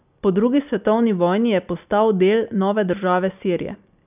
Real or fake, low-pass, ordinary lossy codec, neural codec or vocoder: real; 3.6 kHz; none; none